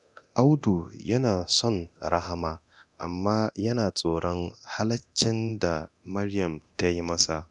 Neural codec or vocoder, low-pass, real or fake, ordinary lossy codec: codec, 24 kHz, 0.9 kbps, DualCodec; 10.8 kHz; fake; none